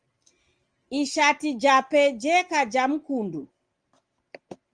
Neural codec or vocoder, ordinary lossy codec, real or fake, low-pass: none; Opus, 24 kbps; real; 9.9 kHz